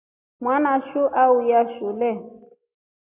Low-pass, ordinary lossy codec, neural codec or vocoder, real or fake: 3.6 kHz; AAC, 32 kbps; none; real